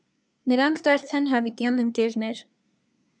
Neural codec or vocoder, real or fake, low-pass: codec, 24 kHz, 1 kbps, SNAC; fake; 9.9 kHz